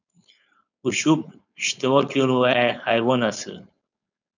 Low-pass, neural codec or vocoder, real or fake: 7.2 kHz; codec, 16 kHz, 4.8 kbps, FACodec; fake